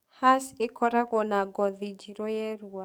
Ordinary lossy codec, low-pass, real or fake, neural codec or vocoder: none; none; fake; codec, 44.1 kHz, 7.8 kbps, DAC